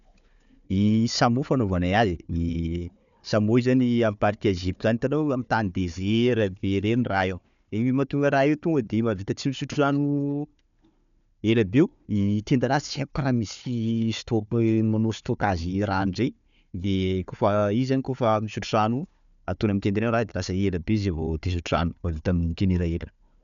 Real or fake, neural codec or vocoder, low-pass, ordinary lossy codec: fake; codec, 16 kHz, 4 kbps, FunCodec, trained on Chinese and English, 50 frames a second; 7.2 kHz; none